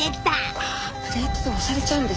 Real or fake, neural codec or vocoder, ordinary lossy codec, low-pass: real; none; none; none